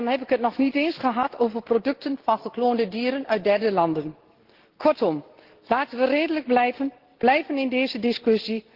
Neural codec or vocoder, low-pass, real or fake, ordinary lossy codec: none; 5.4 kHz; real; Opus, 16 kbps